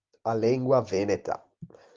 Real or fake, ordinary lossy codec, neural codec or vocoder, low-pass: real; Opus, 32 kbps; none; 7.2 kHz